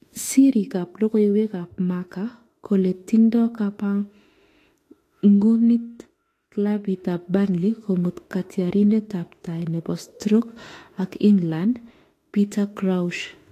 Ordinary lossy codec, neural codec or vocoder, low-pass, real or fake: AAC, 48 kbps; autoencoder, 48 kHz, 32 numbers a frame, DAC-VAE, trained on Japanese speech; 14.4 kHz; fake